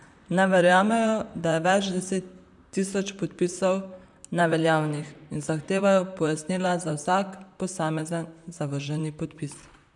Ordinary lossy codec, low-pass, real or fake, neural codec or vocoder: none; 10.8 kHz; fake; vocoder, 44.1 kHz, 128 mel bands, Pupu-Vocoder